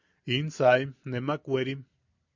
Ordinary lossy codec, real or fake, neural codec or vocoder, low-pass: MP3, 64 kbps; real; none; 7.2 kHz